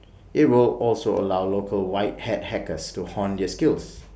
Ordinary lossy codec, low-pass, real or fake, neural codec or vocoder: none; none; real; none